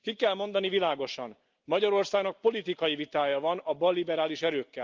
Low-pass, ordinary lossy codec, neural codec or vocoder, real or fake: 7.2 kHz; Opus, 24 kbps; none; real